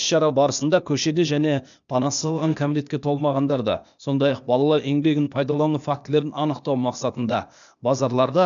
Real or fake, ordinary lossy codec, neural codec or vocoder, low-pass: fake; none; codec, 16 kHz, 0.8 kbps, ZipCodec; 7.2 kHz